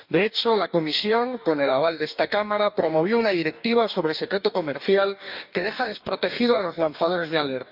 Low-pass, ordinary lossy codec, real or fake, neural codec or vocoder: 5.4 kHz; none; fake; codec, 44.1 kHz, 2.6 kbps, DAC